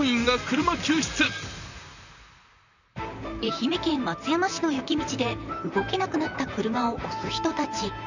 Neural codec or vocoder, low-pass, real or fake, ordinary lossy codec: vocoder, 44.1 kHz, 128 mel bands, Pupu-Vocoder; 7.2 kHz; fake; none